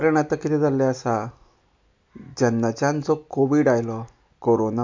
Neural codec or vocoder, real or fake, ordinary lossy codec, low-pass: none; real; MP3, 64 kbps; 7.2 kHz